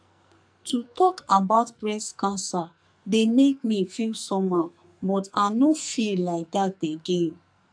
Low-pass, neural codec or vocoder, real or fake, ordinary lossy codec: 9.9 kHz; codec, 44.1 kHz, 2.6 kbps, SNAC; fake; AAC, 64 kbps